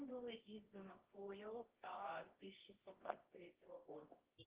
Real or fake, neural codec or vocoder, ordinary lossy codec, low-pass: fake; codec, 24 kHz, 0.9 kbps, WavTokenizer, medium music audio release; Opus, 16 kbps; 3.6 kHz